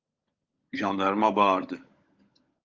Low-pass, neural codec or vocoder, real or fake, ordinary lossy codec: 7.2 kHz; codec, 16 kHz, 16 kbps, FunCodec, trained on LibriTTS, 50 frames a second; fake; Opus, 24 kbps